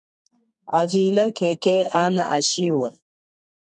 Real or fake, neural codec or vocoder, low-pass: fake; codec, 32 kHz, 1.9 kbps, SNAC; 10.8 kHz